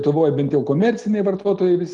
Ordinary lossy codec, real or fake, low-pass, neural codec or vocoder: Opus, 32 kbps; real; 10.8 kHz; none